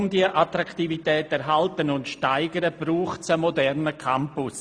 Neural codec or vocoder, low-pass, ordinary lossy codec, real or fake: none; 9.9 kHz; Opus, 64 kbps; real